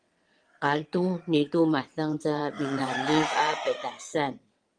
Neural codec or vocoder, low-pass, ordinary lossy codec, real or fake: vocoder, 22.05 kHz, 80 mel bands, WaveNeXt; 9.9 kHz; Opus, 24 kbps; fake